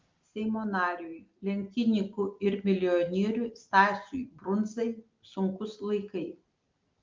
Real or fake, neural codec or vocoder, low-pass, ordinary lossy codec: real; none; 7.2 kHz; Opus, 32 kbps